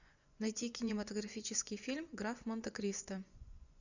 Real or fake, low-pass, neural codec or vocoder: fake; 7.2 kHz; vocoder, 24 kHz, 100 mel bands, Vocos